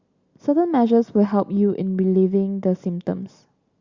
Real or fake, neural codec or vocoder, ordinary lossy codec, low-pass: real; none; Opus, 64 kbps; 7.2 kHz